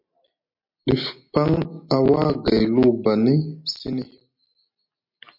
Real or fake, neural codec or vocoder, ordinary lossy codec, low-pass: real; none; MP3, 32 kbps; 5.4 kHz